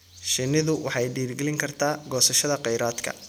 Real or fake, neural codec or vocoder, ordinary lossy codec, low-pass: real; none; none; none